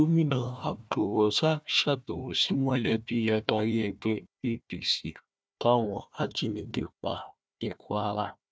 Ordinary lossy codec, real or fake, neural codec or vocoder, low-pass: none; fake; codec, 16 kHz, 1 kbps, FunCodec, trained on Chinese and English, 50 frames a second; none